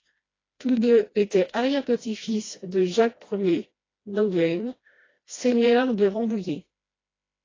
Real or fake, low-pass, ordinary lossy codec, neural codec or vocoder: fake; 7.2 kHz; AAC, 32 kbps; codec, 16 kHz, 1 kbps, FreqCodec, smaller model